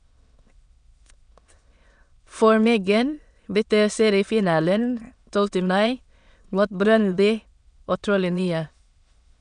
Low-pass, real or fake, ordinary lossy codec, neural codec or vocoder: 9.9 kHz; fake; none; autoencoder, 22.05 kHz, a latent of 192 numbers a frame, VITS, trained on many speakers